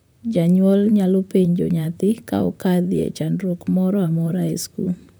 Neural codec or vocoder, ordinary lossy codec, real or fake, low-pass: vocoder, 44.1 kHz, 128 mel bands, Pupu-Vocoder; none; fake; none